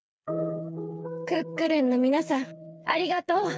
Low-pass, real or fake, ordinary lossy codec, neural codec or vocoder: none; fake; none; codec, 16 kHz, 4 kbps, FreqCodec, smaller model